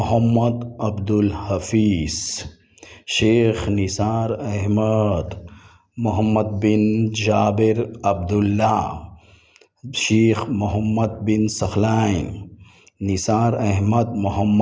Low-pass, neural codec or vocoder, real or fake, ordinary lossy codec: none; none; real; none